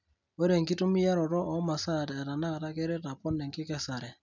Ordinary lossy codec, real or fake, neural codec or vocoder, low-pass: none; real; none; 7.2 kHz